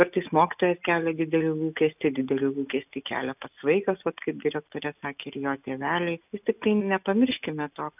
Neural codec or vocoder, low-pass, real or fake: none; 3.6 kHz; real